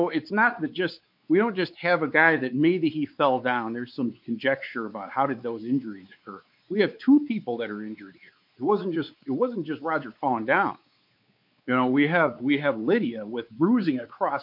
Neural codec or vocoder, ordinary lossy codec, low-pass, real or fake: codec, 16 kHz, 4 kbps, X-Codec, WavLM features, trained on Multilingual LibriSpeech; MP3, 48 kbps; 5.4 kHz; fake